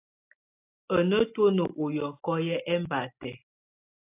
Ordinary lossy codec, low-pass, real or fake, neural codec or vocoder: AAC, 24 kbps; 3.6 kHz; real; none